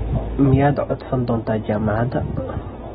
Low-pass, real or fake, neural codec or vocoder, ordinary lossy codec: 7.2 kHz; real; none; AAC, 16 kbps